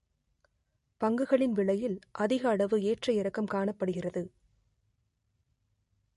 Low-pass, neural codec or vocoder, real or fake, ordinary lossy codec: 14.4 kHz; none; real; MP3, 48 kbps